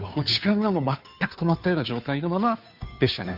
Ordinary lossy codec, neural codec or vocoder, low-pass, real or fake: none; codec, 16 kHz, 2 kbps, FunCodec, trained on Chinese and English, 25 frames a second; 5.4 kHz; fake